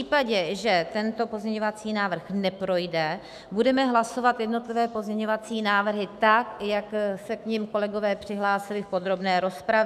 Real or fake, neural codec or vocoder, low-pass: fake; autoencoder, 48 kHz, 128 numbers a frame, DAC-VAE, trained on Japanese speech; 14.4 kHz